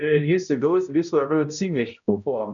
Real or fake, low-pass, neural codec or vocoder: fake; 7.2 kHz; codec, 16 kHz, 0.5 kbps, X-Codec, HuBERT features, trained on balanced general audio